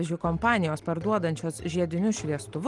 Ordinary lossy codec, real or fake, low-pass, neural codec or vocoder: Opus, 24 kbps; real; 10.8 kHz; none